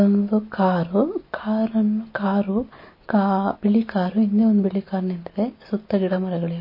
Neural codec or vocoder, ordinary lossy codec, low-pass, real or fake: none; MP3, 24 kbps; 5.4 kHz; real